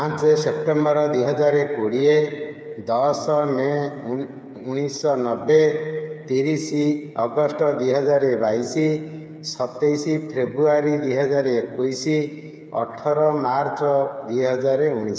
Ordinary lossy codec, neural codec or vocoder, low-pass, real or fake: none; codec, 16 kHz, 8 kbps, FreqCodec, smaller model; none; fake